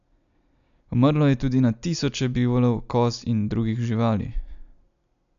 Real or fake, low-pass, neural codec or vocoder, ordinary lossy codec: real; 7.2 kHz; none; none